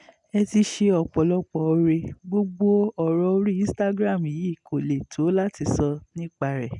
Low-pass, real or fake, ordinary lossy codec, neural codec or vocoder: 10.8 kHz; real; none; none